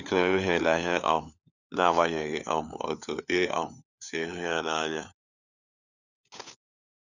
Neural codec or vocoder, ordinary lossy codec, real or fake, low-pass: codec, 16 kHz, 4 kbps, FunCodec, trained on LibriTTS, 50 frames a second; none; fake; 7.2 kHz